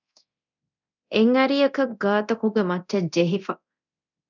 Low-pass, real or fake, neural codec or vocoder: 7.2 kHz; fake; codec, 24 kHz, 0.9 kbps, DualCodec